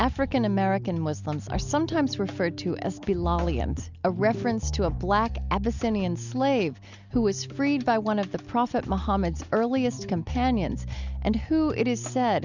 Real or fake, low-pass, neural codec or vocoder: real; 7.2 kHz; none